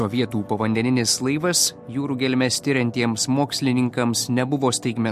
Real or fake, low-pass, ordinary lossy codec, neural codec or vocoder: real; 14.4 kHz; MP3, 64 kbps; none